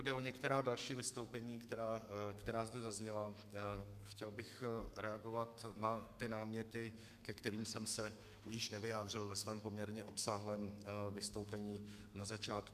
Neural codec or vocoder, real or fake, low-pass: codec, 32 kHz, 1.9 kbps, SNAC; fake; 14.4 kHz